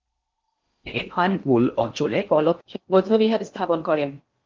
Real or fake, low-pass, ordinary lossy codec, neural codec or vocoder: fake; 7.2 kHz; Opus, 32 kbps; codec, 16 kHz in and 24 kHz out, 0.6 kbps, FocalCodec, streaming, 4096 codes